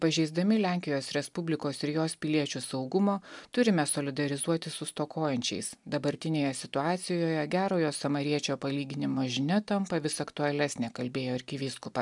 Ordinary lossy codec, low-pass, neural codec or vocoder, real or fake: MP3, 96 kbps; 10.8 kHz; none; real